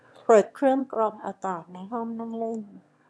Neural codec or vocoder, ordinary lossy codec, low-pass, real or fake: autoencoder, 22.05 kHz, a latent of 192 numbers a frame, VITS, trained on one speaker; none; none; fake